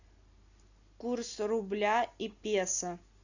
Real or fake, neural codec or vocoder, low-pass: real; none; 7.2 kHz